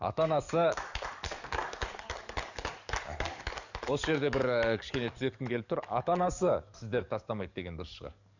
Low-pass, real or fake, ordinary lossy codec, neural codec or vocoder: 7.2 kHz; fake; none; codec, 44.1 kHz, 7.8 kbps, DAC